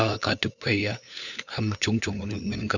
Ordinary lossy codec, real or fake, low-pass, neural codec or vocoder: none; fake; 7.2 kHz; codec, 16 kHz, 4 kbps, FunCodec, trained on LibriTTS, 50 frames a second